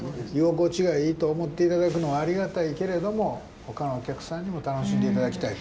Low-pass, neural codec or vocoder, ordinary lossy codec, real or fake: none; none; none; real